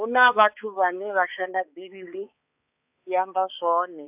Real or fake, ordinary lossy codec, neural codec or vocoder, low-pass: fake; AAC, 32 kbps; codec, 16 kHz, 4 kbps, X-Codec, HuBERT features, trained on balanced general audio; 3.6 kHz